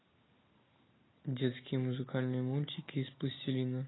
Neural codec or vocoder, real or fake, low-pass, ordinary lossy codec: none; real; 7.2 kHz; AAC, 16 kbps